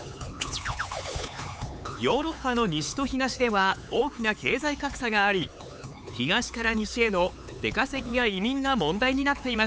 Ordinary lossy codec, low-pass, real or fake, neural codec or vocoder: none; none; fake; codec, 16 kHz, 4 kbps, X-Codec, HuBERT features, trained on LibriSpeech